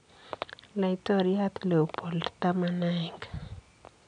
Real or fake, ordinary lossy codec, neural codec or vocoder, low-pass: real; none; none; 9.9 kHz